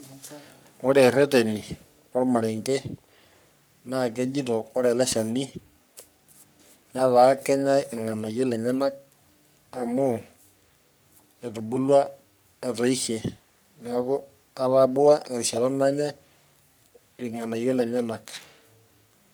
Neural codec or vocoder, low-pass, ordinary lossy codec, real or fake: codec, 44.1 kHz, 3.4 kbps, Pupu-Codec; none; none; fake